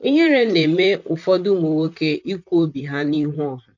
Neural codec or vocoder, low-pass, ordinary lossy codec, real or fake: vocoder, 44.1 kHz, 128 mel bands, Pupu-Vocoder; 7.2 kHz; none; fake